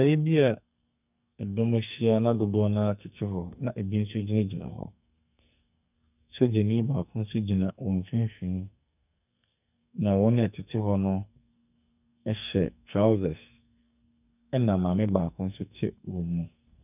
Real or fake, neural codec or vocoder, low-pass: fake; codec, 44.1 kHz, 2.6 kbps, SNAC; 3.6 kHz